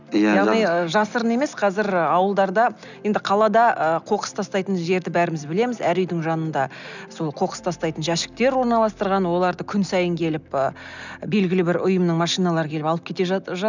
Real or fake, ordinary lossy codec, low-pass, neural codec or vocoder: real; none; 7.2 kHz; none